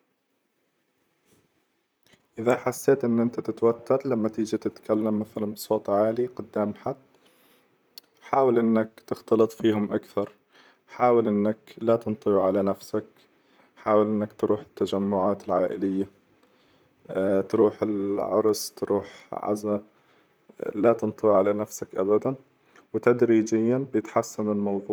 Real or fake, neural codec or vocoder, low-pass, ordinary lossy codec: fake; vocoder, 44.1 kHz, 128 mel bands, Pupu-Vocoder; none; none